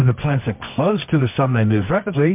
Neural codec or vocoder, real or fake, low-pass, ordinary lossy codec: codec, 24 kHz, 0.9 kbps, WavTokenizer, medium music audio release; fake; 3.6 kHz; MP3, 32 kbps